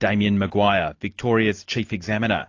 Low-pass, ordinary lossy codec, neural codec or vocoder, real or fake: 7.2 kHz; AAC, 48 kbps; none; real